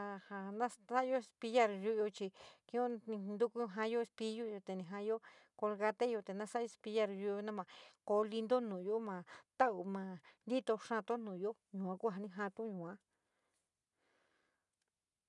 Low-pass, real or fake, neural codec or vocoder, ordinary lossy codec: 10.8 kHz; real; none; none